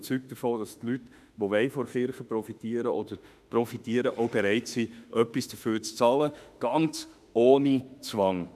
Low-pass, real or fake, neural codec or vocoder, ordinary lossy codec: 14.4 kHz; fake; autoencoder, 48 kHz, 32 numbers a frame, DAC-VAE, trained on Japanese speech; none